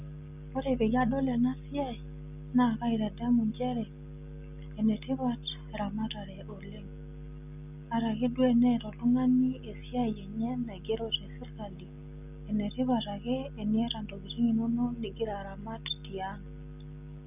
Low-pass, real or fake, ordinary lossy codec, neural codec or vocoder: 3.6 kHz; real; none; none